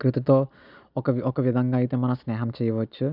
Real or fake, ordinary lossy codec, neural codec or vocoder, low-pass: real; none; none; 5.4 kHz